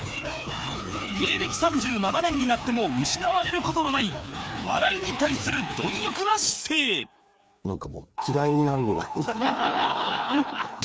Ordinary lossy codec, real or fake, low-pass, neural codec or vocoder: none; fake; none; codec, 16 kHz, 2 kbps, FreqCodec, larger model